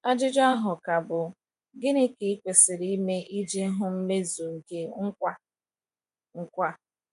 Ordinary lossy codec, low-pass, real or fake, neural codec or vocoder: none; 10.8 kHz; fake; vocoder, 24 kHz, 100 mel bands, Vocos